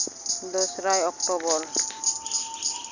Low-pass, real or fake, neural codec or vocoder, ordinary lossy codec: 7.2 kHz; real; none; none